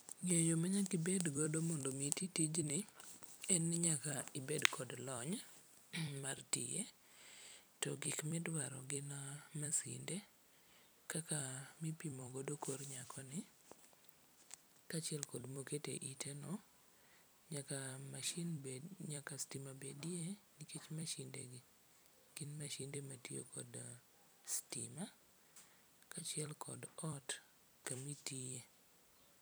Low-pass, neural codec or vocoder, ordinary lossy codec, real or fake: none; vocoder, 44.1 kHz, 128 mel bands every 256 samples, BigVGAN v2; none; fake